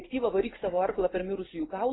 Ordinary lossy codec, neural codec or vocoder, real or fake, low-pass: AAC, 16 kbps; none; real; 7.2 kHz